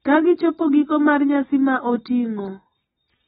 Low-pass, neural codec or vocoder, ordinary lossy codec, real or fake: 7.2 kHz; none; AAC, 16 kbps; real